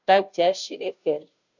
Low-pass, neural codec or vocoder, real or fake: 7.2 kHz; codec, 16 kHz, 0.5 kbps, FunCodec, trained on Chinese and English, 25 frames a second; fake